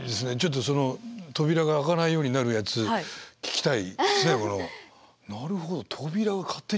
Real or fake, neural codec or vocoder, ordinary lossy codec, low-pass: real; none; none; none